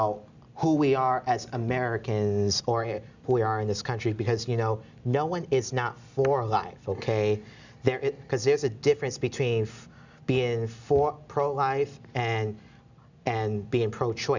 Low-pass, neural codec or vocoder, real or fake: 7.2 kHz; none; real